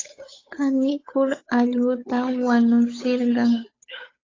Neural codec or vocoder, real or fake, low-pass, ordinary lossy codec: codec, 16 kHz, 8 kbps, FunCodec, trained on Chinese and English, 25 frames a second; fake; 7.2 kHz; AAC, 32 kbps